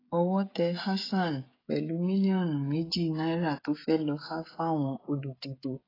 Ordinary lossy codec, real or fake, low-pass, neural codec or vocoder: AAC, 24 kbps; fake; 5.4 kHz; codec, 16 kHz, 16 kbps, FreqCodec, smaller model